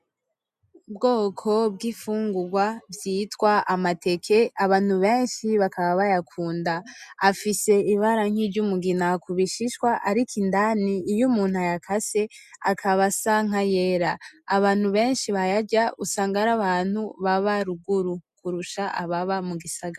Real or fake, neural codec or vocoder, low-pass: real; none; 14.4 kHz